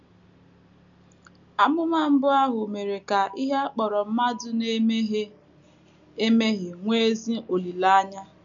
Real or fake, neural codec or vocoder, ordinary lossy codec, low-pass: real; none; none; 7.2 kHz